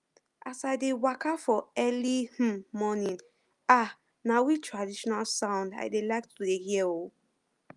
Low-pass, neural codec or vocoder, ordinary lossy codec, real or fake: 10.8 kHz; none; Opus, 32 kbps; real